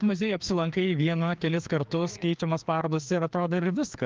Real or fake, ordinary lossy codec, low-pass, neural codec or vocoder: fake; Opus, 32 kbps; 7.2 kHz; codec, 16 kHz, 2 kbps, FreqCodec, larger model